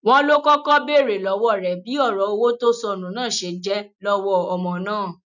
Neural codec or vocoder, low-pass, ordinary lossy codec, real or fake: none; 7.2 kHz; none; real